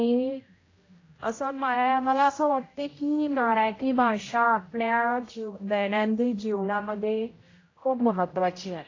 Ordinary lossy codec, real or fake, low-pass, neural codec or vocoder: AAC, 32 kbps; fake; 7.2 kHz; codec, 16 kHz, 0.5 kbps, X-Codec, HuBERT features, trained on general audio